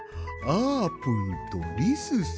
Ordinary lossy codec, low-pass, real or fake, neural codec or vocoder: none; none; real; none